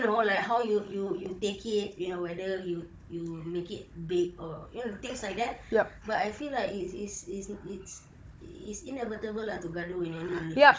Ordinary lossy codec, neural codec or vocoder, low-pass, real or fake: none; codec, 16 kHz, 16 kbps, FunCodec, trained on Chinese and English, 50 frames a second; none; fake